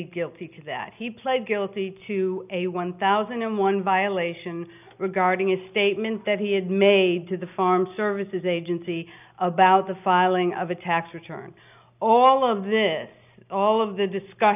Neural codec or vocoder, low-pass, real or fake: none; 3.6 kHz; real